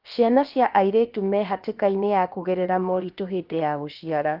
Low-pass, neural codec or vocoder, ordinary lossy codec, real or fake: 5.4 kHz; codec, 16 kHz, about 1 kbps, DyCAST, with the encoder's durations; Opus, 24 kbps; fake